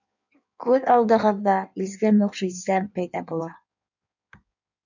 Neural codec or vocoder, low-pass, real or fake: codec, 16 kHz in and 24 kHz out, 1.1 kbps, FireRedTTS-2 codec; 7.2 kHz; fake